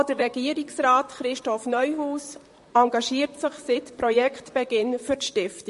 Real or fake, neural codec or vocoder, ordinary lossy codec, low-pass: fake; vocoder, 44.1 kHz, 128 mel bands, Pupu-Vocoder; MP3, 48 kbps; 14.4 kHz